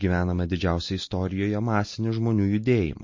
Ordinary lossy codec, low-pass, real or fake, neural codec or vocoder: MP3, 32 kbps; 7.2 kHz; real; none